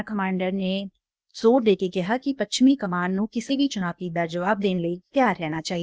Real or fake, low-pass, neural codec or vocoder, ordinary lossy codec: fake; none; codec, 16 kHz, 0.8 kbps, ZipCodec; none